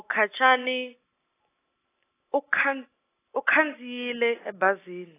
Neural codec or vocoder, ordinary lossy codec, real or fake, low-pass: none; AAC, 16 kbps; real; 3.6 kHz